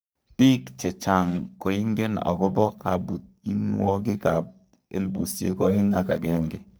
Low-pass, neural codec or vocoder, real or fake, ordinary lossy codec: none; codec, 44.1 kHz, 3.4 kbps, Pupu-Codec; fake; none